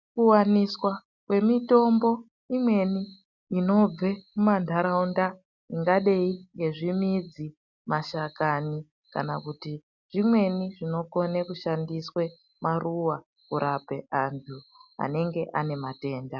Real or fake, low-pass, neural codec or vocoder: real; 7.2 kHz; none